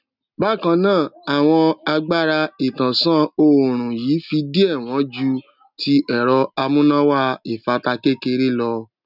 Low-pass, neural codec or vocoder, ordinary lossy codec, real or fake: 5.4 kHz; none; none; real